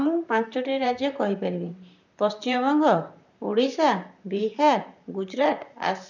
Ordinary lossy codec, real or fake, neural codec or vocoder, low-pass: none; fake; vocoder, 44.1 kHz, 128 mel bands, Pupu-Vocoder; 7.2 kHz